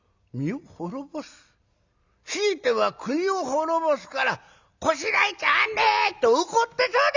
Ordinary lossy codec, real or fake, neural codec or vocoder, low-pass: Opus, 64 kbps; real; none; 7.2 kHz